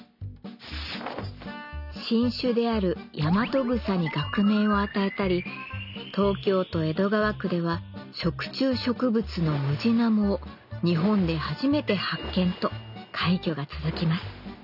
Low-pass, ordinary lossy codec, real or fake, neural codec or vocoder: 5.4 kHz; none; real; none